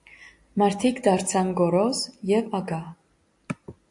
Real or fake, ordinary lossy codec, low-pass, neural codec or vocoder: real; AAC, 64 kbps; 10.8 kHz; none